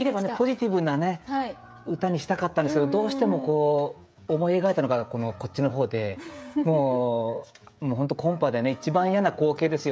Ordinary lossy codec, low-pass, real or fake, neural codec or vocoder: none; none; fake; codec, 16 kHz, 16 kbps, FreqCodec, smaller model